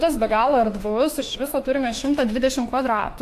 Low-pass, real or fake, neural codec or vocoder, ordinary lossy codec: 14.4 kHz; fake; autoencoder, 48 kHz, 32 numbers a frame, DAC-VAE, trained on Japanese speech; AAC, 64 kbps